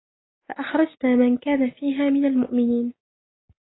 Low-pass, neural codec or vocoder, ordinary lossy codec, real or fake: 7.2 kHz; none; AAC, 16 kbps; real